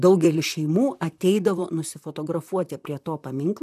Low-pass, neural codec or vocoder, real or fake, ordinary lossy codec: 14.4 kHz; vocoder, 44.1 kHz, 128 mel bands, Pupu-Vocoder; fake; AAC, 96 kbps